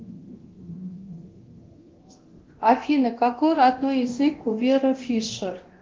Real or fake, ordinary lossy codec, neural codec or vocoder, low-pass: fake; Opus, 16 kbps; codec, 24 kHz, 0.9 kbps, DualCodec; 7.2 kHz